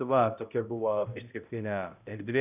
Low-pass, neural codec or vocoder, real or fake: 3.6 kHz; codec, 16 kHz, 0.5 kbps, X-Codec, HuBERT features, trained on balanced general audio; fake